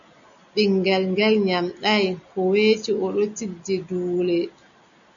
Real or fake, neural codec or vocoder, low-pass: real; none; 7.2 kHz